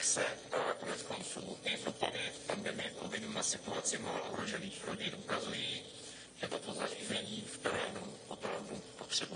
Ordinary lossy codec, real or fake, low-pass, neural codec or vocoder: AAC, 32 kbps; fake; 9.9 kHz; autoencoder, 22.05 kHz, a latent of 192 numbers a frame, VITS, trained on one speaker